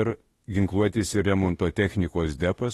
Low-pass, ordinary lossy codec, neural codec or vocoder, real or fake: 19.8 kHz; AAC, 32 kbps; autoencoder, 48 kHz, 32 numbers a frame, DAC-VAE, trained on Japanese speech; fake